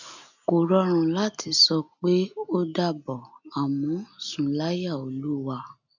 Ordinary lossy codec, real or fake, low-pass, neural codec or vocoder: none; real; 7.2 kHz; none